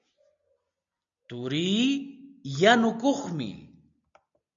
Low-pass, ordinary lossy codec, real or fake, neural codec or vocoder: 7.2 kHz; MP3, 64 kbps; real; none